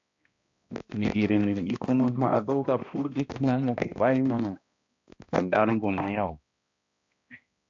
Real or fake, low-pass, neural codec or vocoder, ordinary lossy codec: fake; 7.2 kHz; codec, 16 kHz, 1 kbps, X-Codec, HuBERT features, trained on balanced general audio; AAC, 64 kbps